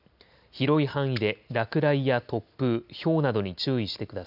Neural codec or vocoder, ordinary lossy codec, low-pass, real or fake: none; none; 5.4 kHz; real